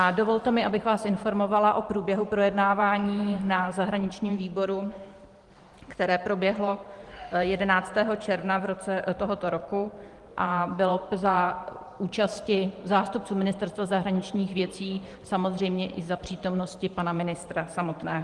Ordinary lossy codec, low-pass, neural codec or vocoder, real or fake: Opus, 24 kbps; 10.8 kHz; vocoder, 44.1 kHz, 128 mel bands every 512 samples, BigVGAN v2; fake